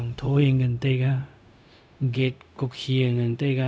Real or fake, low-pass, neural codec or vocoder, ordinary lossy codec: fake; none; codec, 16 kHz, 0.4 kbps, LongCat-Audio-Codec; none